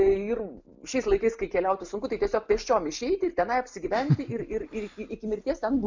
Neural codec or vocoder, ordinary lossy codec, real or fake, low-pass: none; MP3, 64 kbps; real; 7.2 kHz